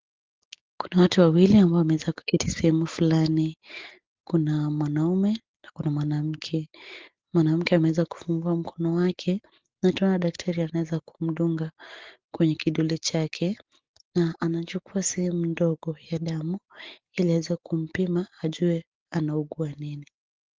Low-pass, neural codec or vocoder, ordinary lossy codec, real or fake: 7.2 kHz; none; Opus, 16 kbps; real